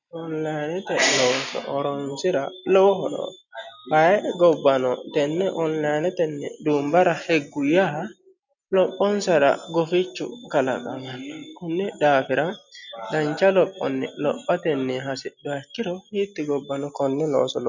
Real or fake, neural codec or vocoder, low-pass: real; none; 7.2 kHz